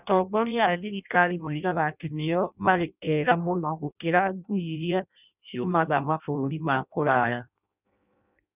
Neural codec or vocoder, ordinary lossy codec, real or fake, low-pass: codec, 16 kHz in and 24 kHz out, 0.6 kbps, FireRedTTS-2 codec; none; fake; 3.6 kHz